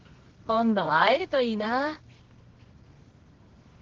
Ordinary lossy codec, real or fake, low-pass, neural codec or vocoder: Opus, 16 kbps; fake; 7.2 kHz; codec, 24 kHz, 0.9 kbps, WavTokenizer, medium music audio release